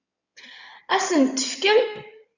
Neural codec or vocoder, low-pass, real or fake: codec, 16 kHz in and 24 kHz out, 2.2 kbps, FireRedTTS-2 codec; 7.2 kHz; fake